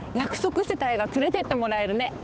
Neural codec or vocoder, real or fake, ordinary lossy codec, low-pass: codec, 16 kHz, 4 kbps, X-Codec, HuBERT features, trained on balanced general audio; fake; none; none